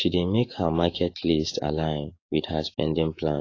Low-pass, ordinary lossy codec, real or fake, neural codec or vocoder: 7.2 kHz; AAC, 32 kbps; fake; codec, 16 kHz, 6 kbps, DAC